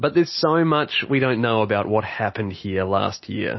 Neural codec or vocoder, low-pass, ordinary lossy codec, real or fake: none; 7.2 kHz; MP3, 24 kbps; real